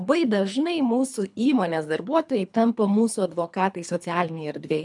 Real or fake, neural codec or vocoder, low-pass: fake; codec, 24 kHz, 3 kbps, HILCodec; 10.8 kHz